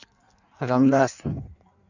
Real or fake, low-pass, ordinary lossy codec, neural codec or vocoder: fake; 7.2 kHz; none; codec, 16 kHz in and 24 kHz out, 1.1 kbps, FireRedTTS-2 codec